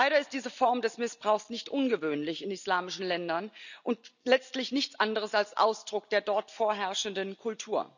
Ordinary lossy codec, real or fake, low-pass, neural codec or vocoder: none; real; 7.2 kHz; none